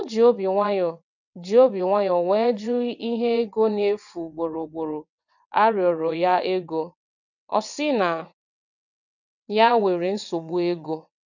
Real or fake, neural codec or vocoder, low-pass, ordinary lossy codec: fake; vocoder, 22.05 kHz, 80 mel bands, WaveNeXt; 7.2 kHz; none